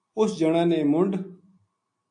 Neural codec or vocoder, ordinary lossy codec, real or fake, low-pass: none; AAC, 64 kbps; real; 9.9 kHz